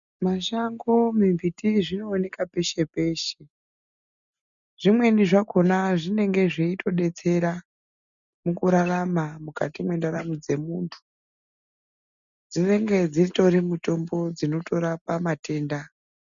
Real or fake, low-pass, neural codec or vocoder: real; 7.2 kHz; none